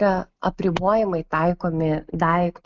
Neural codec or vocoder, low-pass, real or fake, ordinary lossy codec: none; 7.2 kHz; real; Opus, 24 kbps